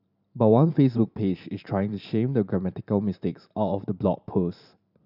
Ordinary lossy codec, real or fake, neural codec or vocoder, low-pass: none; fake; vocoder, 44.1 kHz, 128 mel bands every 512 samples, BigVGAN v2; 5.4 kHz